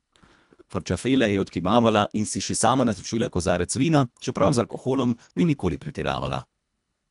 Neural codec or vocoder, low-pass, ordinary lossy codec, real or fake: codec, 24 kHz, 1.5 kbps, HILCodec; 10.8 kHz; none; fake